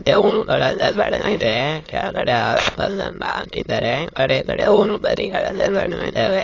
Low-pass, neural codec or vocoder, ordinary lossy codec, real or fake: 7.2 kHz; autoencoder, 22.05 kHz, a latent of 192 numbers a frame, VITS, trained on many speakers; AAC, 32 kbps; fake